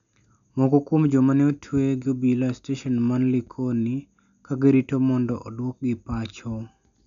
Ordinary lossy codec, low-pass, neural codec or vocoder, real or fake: none; 7.2 kHz; none; real